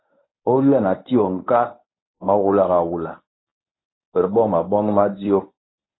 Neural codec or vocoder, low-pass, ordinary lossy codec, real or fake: codec, 24 kHz, 0.9 kbps, WavTokenizer, medium speech release version 1; 7.2 kHz; AAC, 16 kbps; fake